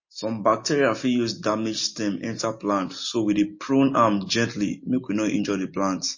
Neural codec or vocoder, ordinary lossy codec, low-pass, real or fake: none; MP3, 32 kbps; 7.2 kHz; real